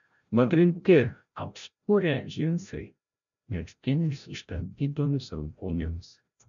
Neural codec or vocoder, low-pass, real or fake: codec, 16 kHz, 0.5 kbps, FreqCodec, larger model; 7.2 kHz; fake